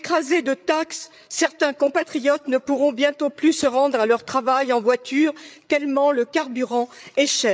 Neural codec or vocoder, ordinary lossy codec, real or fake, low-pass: codec, 16 kHz, 8 kbps, FreqCodec, larger model; none; fake; none